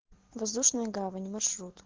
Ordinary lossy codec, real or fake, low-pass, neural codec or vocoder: Opus, 16 kbps; real; 7.2 kHz; none